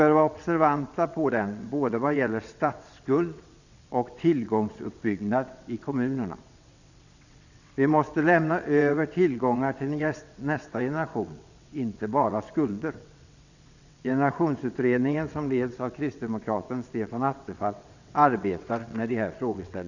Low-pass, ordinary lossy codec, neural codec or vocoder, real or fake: 7.2 kHz; none; vocoder, 22.05 kHz, 80 mel bands, WaveNeXt; fake